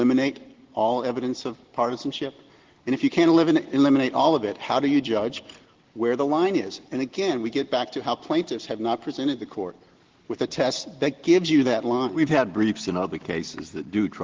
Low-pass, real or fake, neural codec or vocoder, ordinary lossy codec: 7.2 kHz; real; none; Opus, 16 kbps